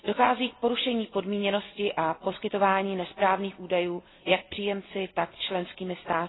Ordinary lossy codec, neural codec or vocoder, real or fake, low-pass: AAC, 16 kbps; none; real; 7.2 kHz